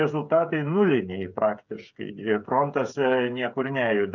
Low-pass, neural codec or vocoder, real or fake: 7.2 kHz; codec, 16 kHz, 8 kbps, FreqCodec, smaller model; fake